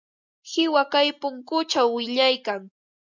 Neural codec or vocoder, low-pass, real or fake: none; 7.2 kHz; real